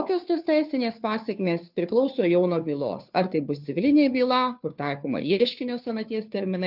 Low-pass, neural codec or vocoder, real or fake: 5.4 kHz; codec, 16 kHz, 2 kbps, FunCodec, trained on Chinese and English, 25 frames a second; fake